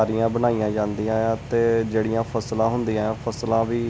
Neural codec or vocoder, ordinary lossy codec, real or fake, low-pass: none; none; real; none